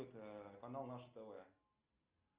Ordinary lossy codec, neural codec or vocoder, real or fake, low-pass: Opus, 32 kbps; none; real; 3.6 kHz